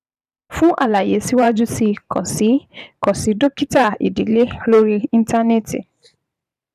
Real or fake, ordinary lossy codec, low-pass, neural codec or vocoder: fake; none; 14.4 kHz; vocoder, 44.1 kHz, 128 mel bands every 512 samples, BigVGAN v2